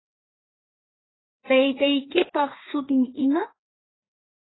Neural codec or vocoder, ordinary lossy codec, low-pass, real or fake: codec, 16 kHz, 2 kbps, FreqCodec, larger model; AAC, 16 kbps; 7.2 kHz; fake